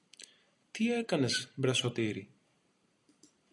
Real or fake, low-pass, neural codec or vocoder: real; 10.8 kHz; none